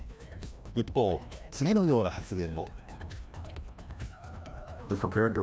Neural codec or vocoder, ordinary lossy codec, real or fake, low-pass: codec, 16 kHz, 1 kbps, FreqCodec, larger model; none; fake; none